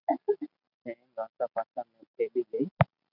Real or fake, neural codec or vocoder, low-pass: real; none; 5.4 kHz